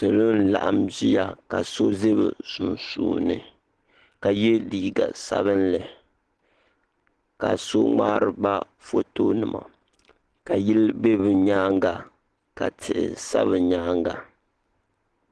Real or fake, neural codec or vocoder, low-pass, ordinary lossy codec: real; none; 10.8 kHz; Opus, 16 kbps